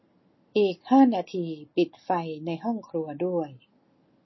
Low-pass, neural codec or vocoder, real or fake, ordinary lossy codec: 7.2 kHz; none; real; MP3, 24 kbps